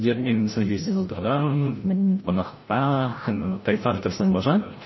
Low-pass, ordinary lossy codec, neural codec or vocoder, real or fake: 7.2 kHz; MP3, 24 kbps; codec, 16 kHz, 0.5 kbps, FreqCodec, larger model; fake